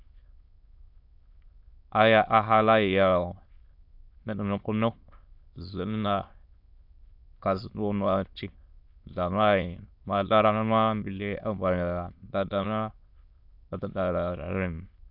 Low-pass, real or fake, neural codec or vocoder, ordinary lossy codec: 5.4 kHz; fake; autoencoder, 22.05 kHz, a latent of 192 numbers a frame, VITS, trained on many speakers; AAC, 48 kbps